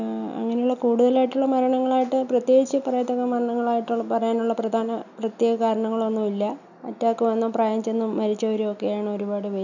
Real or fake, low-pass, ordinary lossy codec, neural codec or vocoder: real; 7.2 kHz; none; none